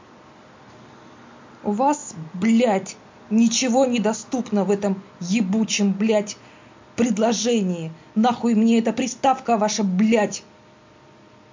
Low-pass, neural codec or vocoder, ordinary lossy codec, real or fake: 7.2 kHz; none; MP3, 48 kbps; real